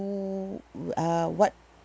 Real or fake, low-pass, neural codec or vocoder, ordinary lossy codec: real; none; none; none